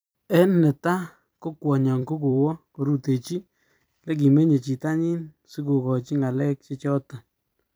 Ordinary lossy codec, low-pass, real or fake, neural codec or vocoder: none; none; fake; vocoder, 44.1 kHz, 128 mel bands every 256 samples, BigVGAN v2